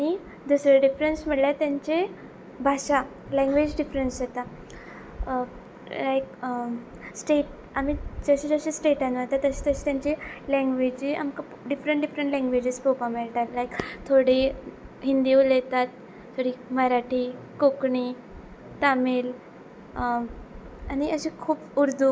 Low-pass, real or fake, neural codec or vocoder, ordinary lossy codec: none; real; none; none